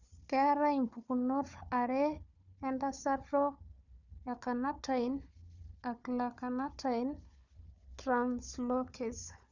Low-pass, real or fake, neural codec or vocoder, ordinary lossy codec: 7.2 kHz; fake; codec, 16 kHz, 4 kbps, FunCodec, trained on Chinese and English, 50 frames a second; none